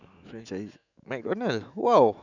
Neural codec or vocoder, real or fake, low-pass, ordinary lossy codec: autoencoder, 48 kHz, 128 numbers a frame, DAC-VAE, trained on Japanese speech; fake; 7.2 kHz; none